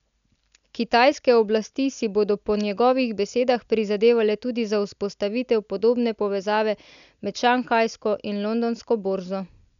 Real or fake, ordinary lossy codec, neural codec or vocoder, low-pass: real; none; none; 7.2 kHz